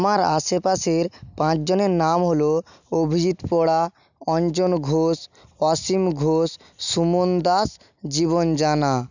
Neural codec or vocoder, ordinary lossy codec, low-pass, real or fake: none; none; 7.2 kHz; real